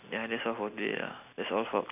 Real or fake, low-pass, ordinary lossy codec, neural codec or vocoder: real; 3.6 kHz; none; none